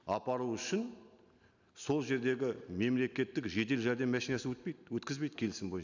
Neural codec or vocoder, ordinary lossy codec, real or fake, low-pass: none; none; real; 7.2 kHz